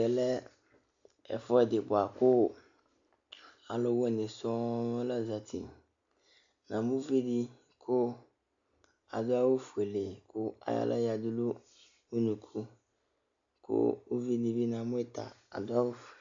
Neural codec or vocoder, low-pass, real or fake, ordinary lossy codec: none; 7.2 kHz; real; AAC, 64 kbps